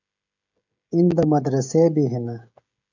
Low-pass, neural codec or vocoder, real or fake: 7.2 kHz; codec, 16 kHz, 16 kbps, FreqCodec, smaller model; fake